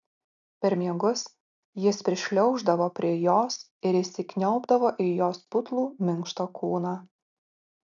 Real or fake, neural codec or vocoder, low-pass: real; none; 7.2 kHz